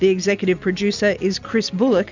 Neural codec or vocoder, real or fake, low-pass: none; real; 7.2 kHz